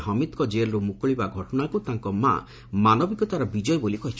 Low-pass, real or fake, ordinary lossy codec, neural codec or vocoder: none; real; none; none